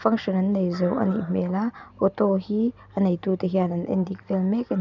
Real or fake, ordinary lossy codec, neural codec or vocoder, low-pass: real; none; none; 7.2 kHz